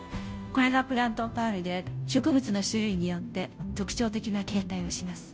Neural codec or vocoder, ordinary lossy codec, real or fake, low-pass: codec, 16 kHz, 0.5 kbps, FunCodec, trained on Chinese and English, 25 frames a second; none; fake; none